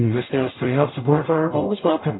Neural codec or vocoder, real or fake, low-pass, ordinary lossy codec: codec, 44.1 kHz, 0.9 kbps, DAC; fake; 7.2 kHz; AAC, 16 kbps